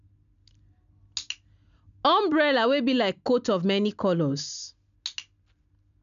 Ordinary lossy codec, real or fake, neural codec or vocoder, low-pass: MP3, 96 kbps; real; none; 7.2 kHz